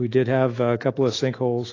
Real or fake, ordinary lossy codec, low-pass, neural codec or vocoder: fake; AAC, 32 kbps; 7.2 kHz; autoencoder, 48 kHz, 128 numbers a frame, DAC-VAE, trained on Japanese speech